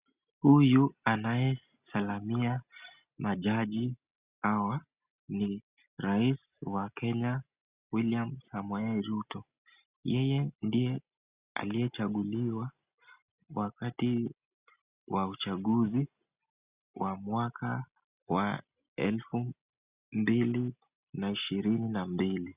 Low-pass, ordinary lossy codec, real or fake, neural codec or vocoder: 3.6 kHz; Opus, 64 kbps; real; none